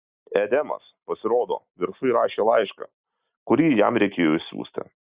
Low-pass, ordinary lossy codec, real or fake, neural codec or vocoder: 3.6 kHz; Opus, 64 kbps; real; none